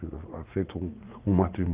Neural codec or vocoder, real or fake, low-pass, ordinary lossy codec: vocoder, 44.1 kHz, 80 mel bands, Vocos; fake; 3.6 kHz; Opus, 24 kbps